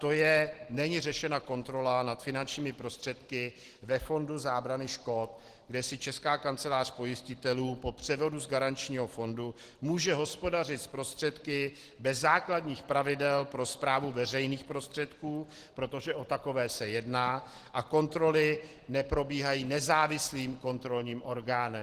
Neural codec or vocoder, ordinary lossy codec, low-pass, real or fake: none; Opus, 16 kbps; 14.4 kHz; real